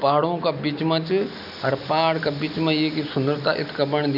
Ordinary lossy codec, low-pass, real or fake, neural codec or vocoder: none; 5.4 kHz; real; none